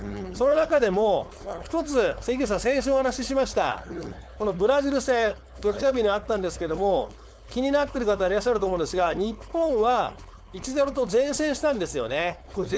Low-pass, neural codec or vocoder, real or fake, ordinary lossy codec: none; codec, 16 kHz, 4.8 kbps, FACodec; fake; none